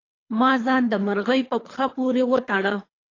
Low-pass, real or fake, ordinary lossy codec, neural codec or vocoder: 7.2 kHz; fake; AAC, 32 kbps; codec, 24 kHz, 3 kbps, HILCodec